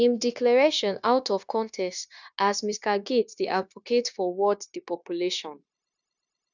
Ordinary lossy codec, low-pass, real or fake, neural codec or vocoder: none; 7.2 kHz; fake; codec, 16 kHz, 0.9 kbps, LongCat-Audio-Codec